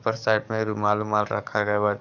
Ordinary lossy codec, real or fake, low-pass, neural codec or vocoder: none; fake; 7.2 kHz; codec, 44.1 kHz, 7.8 kbps, DAC